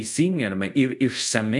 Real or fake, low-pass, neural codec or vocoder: fake; 10.8 kHz; codec, 24 kHz, 0.5 kbps, DualCodec